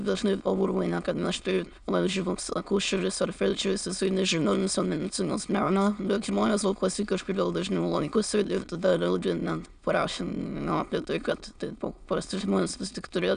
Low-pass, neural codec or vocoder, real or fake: 9.9 kHz; autoencoder, 22.05 kHz, a latent of 192 numbers a frame, VITS, trained on many speakers; fake